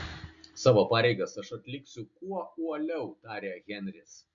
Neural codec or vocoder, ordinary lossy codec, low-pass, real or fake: none; MP3, 64 kbps; 7.2 kHz; real